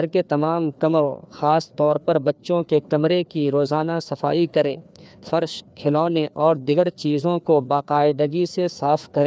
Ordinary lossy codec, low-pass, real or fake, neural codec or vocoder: none; none; fake; codec, 16 kHz, 2 kbps, FreqCodec, larger model